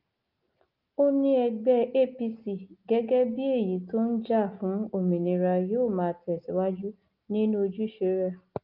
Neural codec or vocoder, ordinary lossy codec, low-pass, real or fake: none; Opus, 24 kbps; 5.4 kHz; real